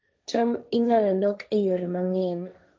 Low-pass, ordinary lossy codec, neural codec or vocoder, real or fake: none; none; codec, 16 kHz, 1.1 kbps, Voila-Tokenizer; fake